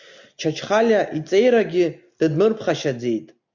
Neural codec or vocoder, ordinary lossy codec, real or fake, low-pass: none; MP3, 64 kbps; real; 7.2 kHz